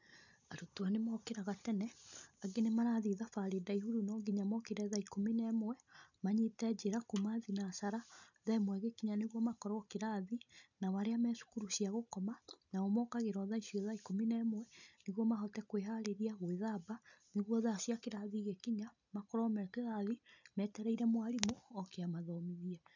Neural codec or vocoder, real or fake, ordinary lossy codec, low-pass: none; real; none; 7.2 kHz